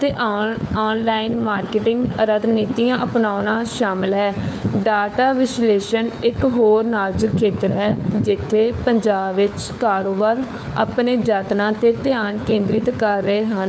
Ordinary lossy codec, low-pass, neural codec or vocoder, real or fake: none; none; codec, 16 kHz, 4 kbps, FunCodec, trained on LibriTTS, 50 frames a second; fake